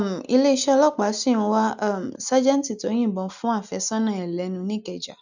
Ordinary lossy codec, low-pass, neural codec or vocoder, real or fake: none; 7.2 kHz; none; real